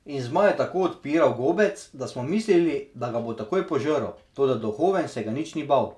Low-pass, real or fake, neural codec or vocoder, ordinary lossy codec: none; real; none; none